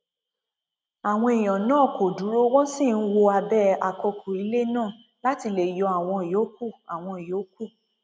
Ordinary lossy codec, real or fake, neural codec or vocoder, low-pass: none; real; none; none